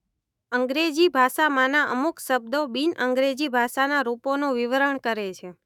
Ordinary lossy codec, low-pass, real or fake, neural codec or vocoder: none; 19.8 kHz; fake; autoencoder, 48 kHz, 128 numbers a frame, DAC-VAE, trained on Japanese speech